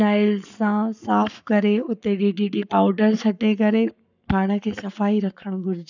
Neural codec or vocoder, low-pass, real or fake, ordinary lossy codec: codec, 44.1 kHz, 7.8 kbps, Pupu-Codec; 7.2 kHz; fake; none